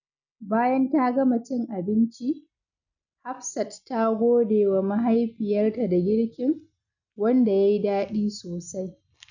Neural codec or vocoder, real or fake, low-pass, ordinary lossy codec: none; real; 7.2 kHz; none